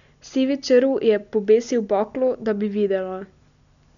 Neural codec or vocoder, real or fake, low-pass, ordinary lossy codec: none; real; 7.2 kHz; none